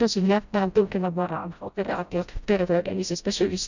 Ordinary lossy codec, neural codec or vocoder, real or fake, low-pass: none; codec, 16 kHz, 0.5 kbps, FreqCodec, smaller model; fake; 7.2 kHz